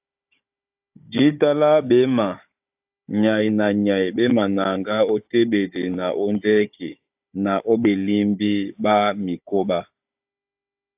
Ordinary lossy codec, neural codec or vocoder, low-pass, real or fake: AAC, 32 kbps; codec, 16 kHz, 16 kbps, FunCodec, trained on Chinese and English, 50 frames a second; 3.6 kHz; fake